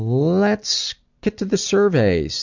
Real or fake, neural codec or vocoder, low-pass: real; none; 7.2 kHz